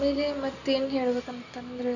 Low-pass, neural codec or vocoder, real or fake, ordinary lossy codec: 7.2 kHz; none; real; none